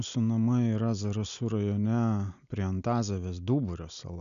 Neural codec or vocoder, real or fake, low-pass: none; real; 7.2 kHz